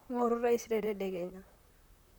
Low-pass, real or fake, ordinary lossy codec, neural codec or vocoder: 19.8 kHz; fake; none; vocoder, 44.1 kHz, 128 mel bands, Pupu-Vocoder